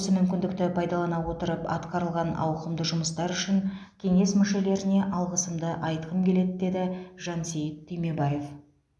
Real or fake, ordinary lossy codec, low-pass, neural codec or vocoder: real; none; none; none